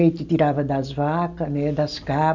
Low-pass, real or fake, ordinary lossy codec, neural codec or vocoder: 7.2 kHz; real; none; none